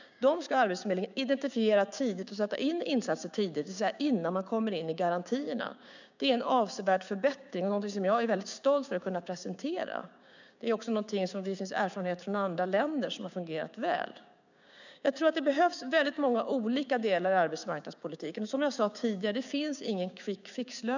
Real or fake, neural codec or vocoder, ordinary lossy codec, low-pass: fake; codec, 16 kHz, 6 kbps, DAC; none; 7.2 kHz